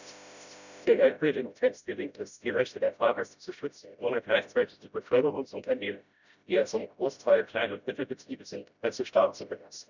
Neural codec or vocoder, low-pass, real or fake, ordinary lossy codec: codec, 16 kHz, 0.5 kbps, FreqCodec, smaller model; 7.2 kHz; fake; none